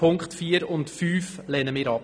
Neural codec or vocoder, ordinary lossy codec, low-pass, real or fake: none; none; 9.9 kHz; real